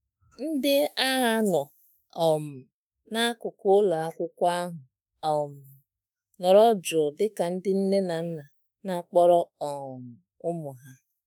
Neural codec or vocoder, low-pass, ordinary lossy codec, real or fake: autoencoder, 48 kHz, 32 numbers a frame, DAC-VAE, trained on Japanese speech; none; none; fake